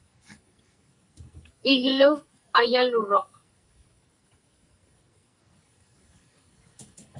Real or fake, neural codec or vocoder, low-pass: fake; codec, 44.1 kHz, 2.6 kbps, SNAC; 10.8 kHz